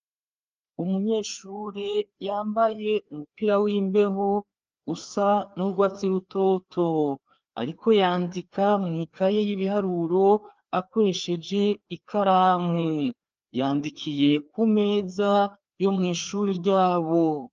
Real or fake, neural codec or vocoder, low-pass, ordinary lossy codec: fake; codec, 16 kHz, 2 kbps, FreqCodec, larger model; 7.2 kHz; Opus, 24 kbps